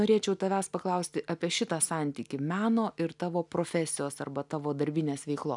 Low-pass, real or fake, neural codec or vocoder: 10.8 kHz; real; none